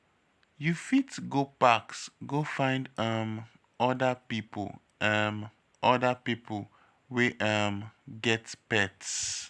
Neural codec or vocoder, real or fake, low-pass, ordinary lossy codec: none; real; none; none